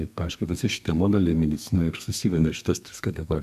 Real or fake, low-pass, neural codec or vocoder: fake; 14.4 kHz; codec, 32 kHz, 1.9 kbps, SNAC